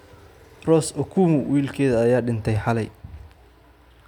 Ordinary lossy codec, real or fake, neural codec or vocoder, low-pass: none; real; none; 19.8 kHz